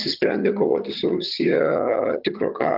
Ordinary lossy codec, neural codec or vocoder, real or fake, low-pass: Opus, 32 kbps; vocoder, 22.05 kHz, 80 mel bands, HiFi-GAN; fake; 5.4 kHz